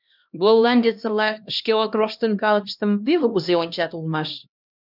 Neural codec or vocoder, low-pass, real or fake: codec, 16 kHz, 1 kbps, X-Codec, HuBERT features, trained on LibriSpeech; 5.4 kHz; fake